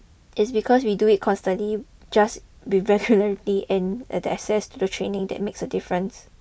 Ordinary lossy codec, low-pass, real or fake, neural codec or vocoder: none; none; real; none